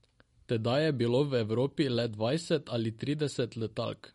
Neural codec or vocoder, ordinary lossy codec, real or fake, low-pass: none; MP3, 48 kbps; real; 19.8 kHz